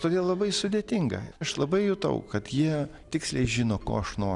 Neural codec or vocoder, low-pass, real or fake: none; 10.8 kHz; real